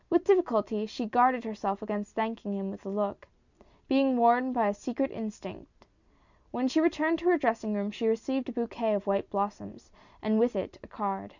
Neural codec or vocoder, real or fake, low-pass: none; real; 7.2 kHz